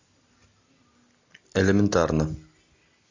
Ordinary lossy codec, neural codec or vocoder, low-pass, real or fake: AAC, 48 kbps; none; 7.2 kHz; real